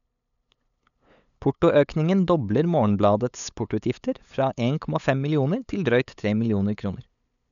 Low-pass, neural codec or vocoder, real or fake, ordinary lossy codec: 7.2 kHz; codec, 16 kHz, 8 kbps, FunCodec, trained on LibriTTS, 25 frames a second; fake; none